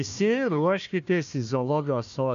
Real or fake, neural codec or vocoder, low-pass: fake; codec, 16 kHz, 1 kbps, FunCodec, trained on Chinese and English, 50 frames a second; 7.2 kHz